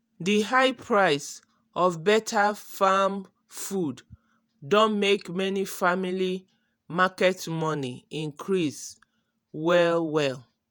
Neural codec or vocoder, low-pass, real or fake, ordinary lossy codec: vocoder, 48 kHz, 128 mel bands, Vocos; none; fake; none